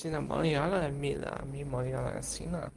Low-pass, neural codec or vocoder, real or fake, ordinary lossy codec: 10.8 kHz; none; real; Opus, 24 kbps